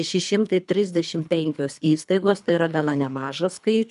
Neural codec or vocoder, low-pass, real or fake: codec, 24 kHz, 3 kbps, HILCodec; 10.8 kHz; fake